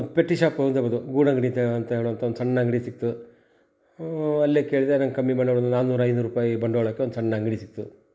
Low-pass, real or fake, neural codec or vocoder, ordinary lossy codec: none; real; none; none